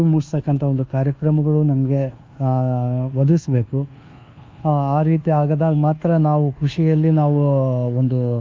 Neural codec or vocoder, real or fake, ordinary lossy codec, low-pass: codec, 24 kHz, 1.2 kbps, DualCodec; fake; Opus, 32 kbps; 7.2 kHz